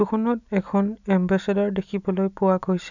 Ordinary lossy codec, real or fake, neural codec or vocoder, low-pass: none; real; none; 7.2 kHz